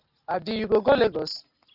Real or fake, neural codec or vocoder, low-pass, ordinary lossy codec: real; none; 5.4 kHz; Opus, 32 kbps